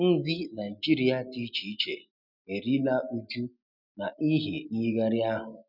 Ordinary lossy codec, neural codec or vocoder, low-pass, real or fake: none; none; 5.4 kHz; real